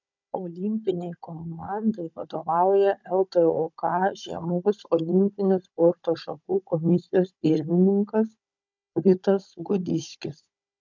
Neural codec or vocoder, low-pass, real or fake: codec, 16 kHz, 16 kbps, FunCodec, trained on Chinese and English, 50 frames a second; 7.2 kHz; fake